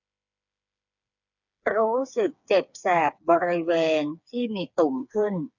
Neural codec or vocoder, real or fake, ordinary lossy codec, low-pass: codec, 16 kHz, 4 kbps, FreqCodec, smaller model; fake; none; 7.2 kHz